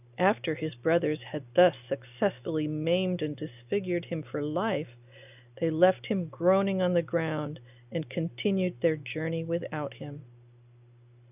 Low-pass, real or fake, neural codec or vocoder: 3.6 kHz; real; none